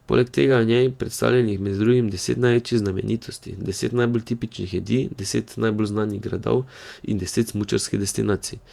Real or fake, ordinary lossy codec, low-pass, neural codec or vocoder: fake; Opus, 64 kbps; 19.8 kHz; vocoder, 48 kHz, 128 mel bands, Vocos